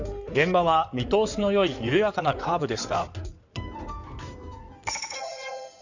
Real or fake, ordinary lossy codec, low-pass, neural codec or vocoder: fake; none; 7.2 kHz; codec, 16 kHz in and 24 kHz out, 2.2 kbps, FireRedTTS-2 codec